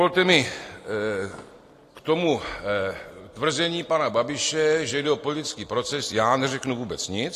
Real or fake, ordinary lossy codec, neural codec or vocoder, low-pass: real; AAC, 48 kbps; none; 14.4 kHz